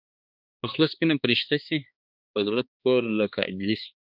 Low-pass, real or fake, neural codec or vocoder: 5.4 kHz; fake; codec, 16 kHz, 2 kbps, X-Codec, HuBERT features, trained on balanced general audio